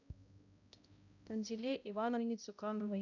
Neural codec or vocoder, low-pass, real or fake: codec, 16 kHz, 0.5 kbps, X-Codec, HuBERT features, trained on balanced general audio; 7.2 kHz; fake